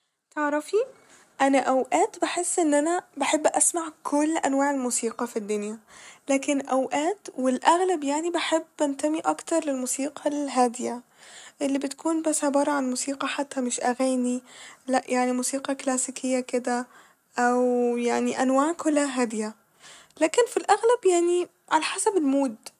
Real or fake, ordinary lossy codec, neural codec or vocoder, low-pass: real; none; none; 14.4 kHz